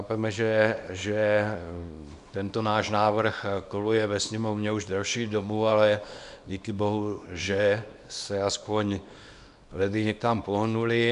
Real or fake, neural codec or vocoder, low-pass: fake; codec, 24 kHz, 0.9 kbps, WavTokenizer, small release; 10.8 kHz